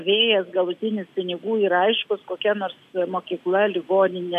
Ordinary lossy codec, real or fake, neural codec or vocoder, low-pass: MP3, 96 kbps; real; none; 14.4 kHz